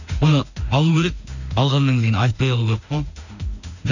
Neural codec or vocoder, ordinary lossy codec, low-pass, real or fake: autoencoder, 48 kHz, 32 numbers a frame, DAC-VAE, trained on Japanese speech; none; 7.2 kHz; fake